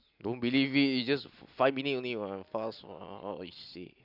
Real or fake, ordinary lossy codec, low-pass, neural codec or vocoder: fake; none; 5.4 kHz; vocoder, 44.1 kHz, 128 mel bands every 256 samples, BigVGAN v2